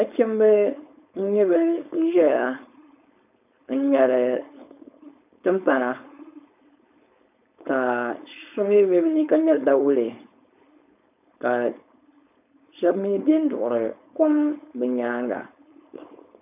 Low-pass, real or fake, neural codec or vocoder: 3.6 kHz; fake; codec, 16 kHz, 4.8 kbps, FACodec